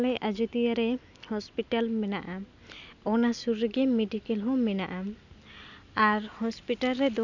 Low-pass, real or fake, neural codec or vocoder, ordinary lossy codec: 7.2 kHz; real; none; none